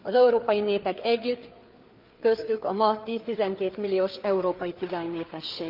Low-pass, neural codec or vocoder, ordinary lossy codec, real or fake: 5.4 kHz; codec, 16 kHz, 4 kbps, FreqCodec, larger model; Opus, 24 kbps; fake